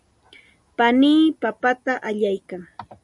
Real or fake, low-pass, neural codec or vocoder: real; 10.8 kHz; none